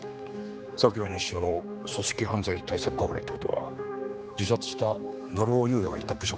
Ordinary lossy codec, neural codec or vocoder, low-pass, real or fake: none; codec, 16 kHz, 2 kbps, X-Codec, HuBERT features, trained on general audio; none; fake